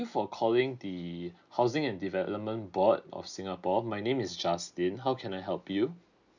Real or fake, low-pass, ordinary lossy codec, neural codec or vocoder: real; 7.2 kHz; none; none